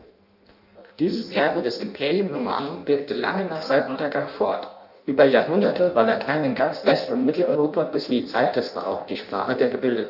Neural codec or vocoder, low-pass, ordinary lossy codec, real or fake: codec, 16 kHz in and 24 kHz out, 0.6 kbps, FireRedTTS-2 codec; 5.4 kHz; none; fake